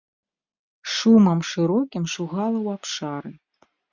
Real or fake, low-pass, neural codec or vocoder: real; 7.2 kHz; none